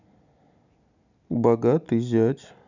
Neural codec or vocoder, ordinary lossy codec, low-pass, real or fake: none; none; 7.2 kHz; real